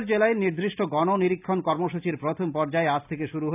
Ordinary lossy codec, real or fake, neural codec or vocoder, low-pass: none; real; none; 3.6 kHz